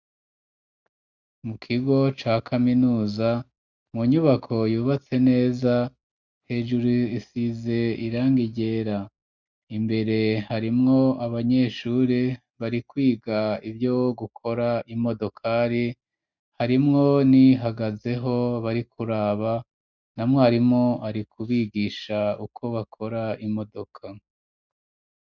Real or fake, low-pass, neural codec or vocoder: real; 7.2 kHz; none